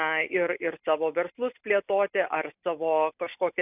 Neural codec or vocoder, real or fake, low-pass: none; real; 3.6 kHz